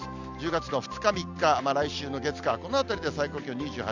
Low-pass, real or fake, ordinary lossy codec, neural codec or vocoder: 7.2 kHz; real; none; none